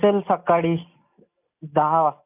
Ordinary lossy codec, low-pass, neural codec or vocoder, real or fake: none; 3.6 kHz; none; real